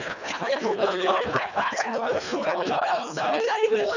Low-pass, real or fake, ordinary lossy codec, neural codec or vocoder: 7.2 kHz; fake; none; codec, 24 kHz, 1.5 kbps, HILCodec